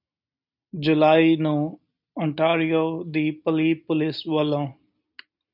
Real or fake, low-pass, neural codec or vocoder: real; 5.4 kHz; none